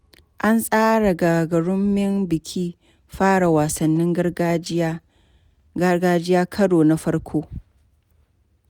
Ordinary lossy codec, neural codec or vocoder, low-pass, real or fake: none; none; 19.8 kHz; real